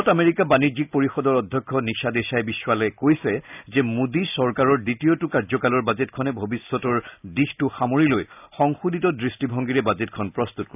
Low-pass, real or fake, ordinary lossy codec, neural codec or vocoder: 3.6 kHz; real; none; none